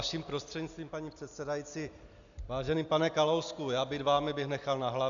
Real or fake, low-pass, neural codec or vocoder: real; 7.2 kHz; none